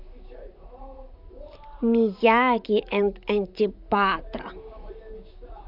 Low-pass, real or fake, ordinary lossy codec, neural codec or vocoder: 5.4 kHz; fake; none; vocoder, 44.1 kHz, 128 mel bands, Pupu-Vocoder